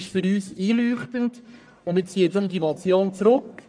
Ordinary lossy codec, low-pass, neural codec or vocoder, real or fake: none; 9.9 kHz; codec, 44.1 kHz, 1.7 kbps, Pupu-Codec; fake